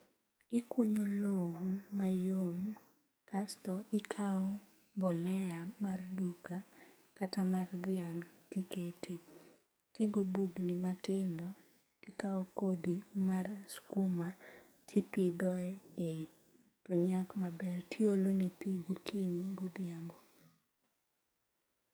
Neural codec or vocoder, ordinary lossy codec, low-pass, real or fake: codec, 44.1 kHz, 2.6 kbps, SNAC; none; none; fake